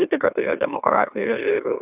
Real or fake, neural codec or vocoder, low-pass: fake; autoencoder, 44.1 kHz, a latent of 192 numbers a frame, MeloTTS; 3.6 kHz